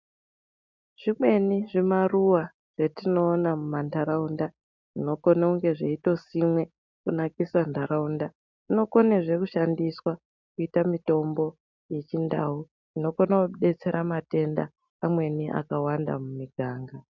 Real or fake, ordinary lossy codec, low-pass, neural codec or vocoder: real; MP3, 64 kbps; 7.2 kHz; none